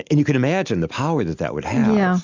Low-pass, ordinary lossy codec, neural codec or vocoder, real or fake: 7.2 kHz; MP3, 64 kbps; none; real